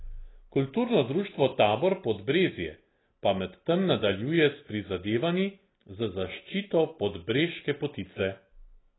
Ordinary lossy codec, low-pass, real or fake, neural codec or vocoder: AAC, 16 kbps; 7.2 kHz; real; none